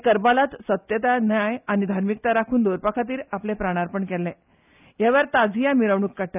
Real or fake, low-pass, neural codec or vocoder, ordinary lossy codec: real; 3.6 kHz; none; none